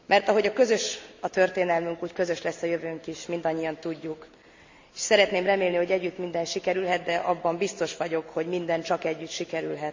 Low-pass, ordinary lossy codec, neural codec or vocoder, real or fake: 7.2 kHz; MP3, 64 kbps; none; real